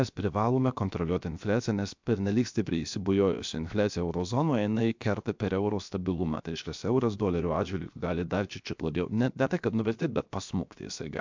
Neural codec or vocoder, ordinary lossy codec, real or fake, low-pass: codec, 16 kHz, 0.7 kbps, FocalCodec; MP3, 64 kbps; fake; 7.2 kHz